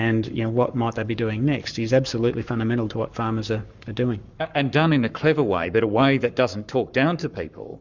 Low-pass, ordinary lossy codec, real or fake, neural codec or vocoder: 7.2 kHz; Opus, 64 kbps; fake; codec, 44.1 kHz, 7.8 kbps, Pupu-Codec